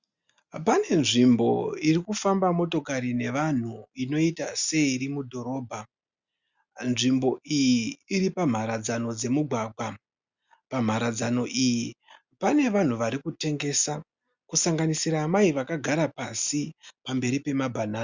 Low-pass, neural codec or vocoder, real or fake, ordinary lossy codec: 7.2 kHz; none; real; Opus, 64 kbps